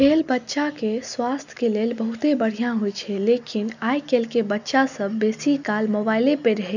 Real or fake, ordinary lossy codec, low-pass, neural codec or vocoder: real; none; 7.2 kHz; none